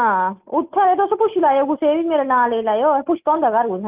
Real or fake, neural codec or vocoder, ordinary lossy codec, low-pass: real; none; Opus, 32 kbps; 3.6 kHz